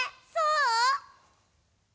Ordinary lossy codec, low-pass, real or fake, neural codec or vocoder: none; none; real; none